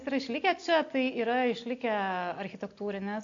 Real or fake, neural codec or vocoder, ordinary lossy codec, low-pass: real; none; AAC, 48 kbps; 7.2 kHz